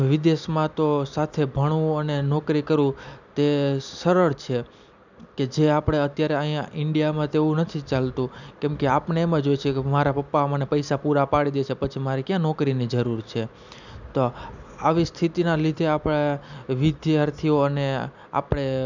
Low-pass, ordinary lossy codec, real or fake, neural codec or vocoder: 7.2 kHz; none; real; none